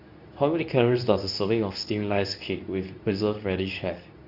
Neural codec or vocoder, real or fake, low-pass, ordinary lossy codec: codec, 24 kHz, 0.9 kbps, WavTokenizer, medium speech release version 2; fake; 5.4 kHz; none